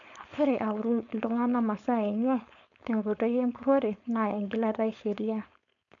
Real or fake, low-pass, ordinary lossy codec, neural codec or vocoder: fake; 7.2 kHz; none; codec, 16 kHz, 4.8 kbps, FACodec